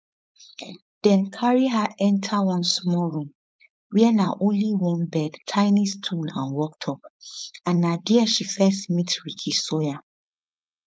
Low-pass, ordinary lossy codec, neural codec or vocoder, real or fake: none; none; codec, 16 kHz, 4.8 kbps, FACodec; fake